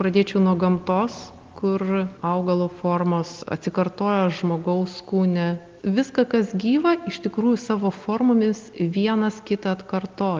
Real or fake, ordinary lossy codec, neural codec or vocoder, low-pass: real; Opus, 24 kbps; none; 7.2 kHz